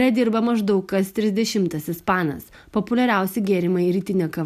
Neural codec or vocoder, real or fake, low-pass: none; real; 14.4 kHz